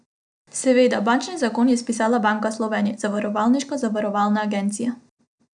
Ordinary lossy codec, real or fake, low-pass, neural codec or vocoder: none; real; 9.9 kHz; none